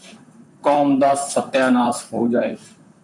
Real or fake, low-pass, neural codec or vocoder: fake; 10.8 kHz; vocoder, 44.1 kHz, 128 mel bands, Pupu-Vocoder